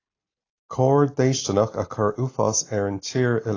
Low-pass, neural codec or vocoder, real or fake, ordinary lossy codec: 7.2 kHz; none; real; AAC, 32 kbps